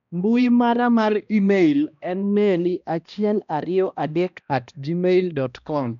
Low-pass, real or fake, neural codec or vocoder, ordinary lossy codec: 7.2 kHz; fake; codec, 16 kHz, 1 kbps, X-Codec, HuBERT features, trained on balanced general audio; none